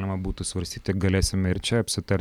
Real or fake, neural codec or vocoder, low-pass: real; none; 19.8 kHz